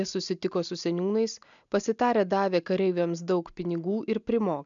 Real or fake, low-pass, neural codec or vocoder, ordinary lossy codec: real; 7.2 kHz; none; MP3, 96 kbps